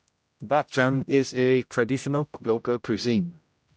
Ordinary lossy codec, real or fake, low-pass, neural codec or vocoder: none; fake; none; codec, 16 kHz, 0.5 kbps, X-Codec, HuBERT features, trained on general audio